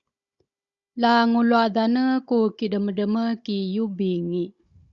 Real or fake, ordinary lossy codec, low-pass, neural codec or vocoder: fake; Opus, 64 kbps; 7.2 kHz; codec, 16 kHz, 16 kbps, FunCodec, trained on Chinese and English, 50 frames a second